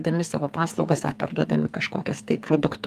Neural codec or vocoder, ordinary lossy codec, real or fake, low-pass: codec, 44.1 kHz, 2.6 kbps, SNAC; Opus, 32 kbps; fake; 14.4 kHz